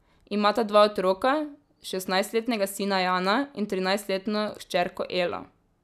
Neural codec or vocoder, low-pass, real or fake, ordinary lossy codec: none; 14.4 kHz; real; none